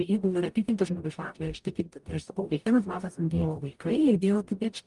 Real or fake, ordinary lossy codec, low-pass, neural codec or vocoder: fake; Opus, 32 kbps; 10.8 kHz; codec, 44.1 kHz, 0.9 kbps, DAC